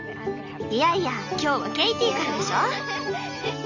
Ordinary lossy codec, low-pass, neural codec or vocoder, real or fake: none; 7.2 kHz; none; real